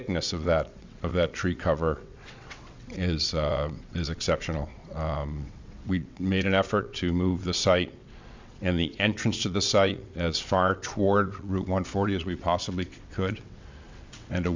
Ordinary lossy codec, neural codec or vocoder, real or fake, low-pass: MP3, 64 kbps; vocoder, 22.05 kHz, 80 mel bands, WaveNeXt; fake; 7.2 kHz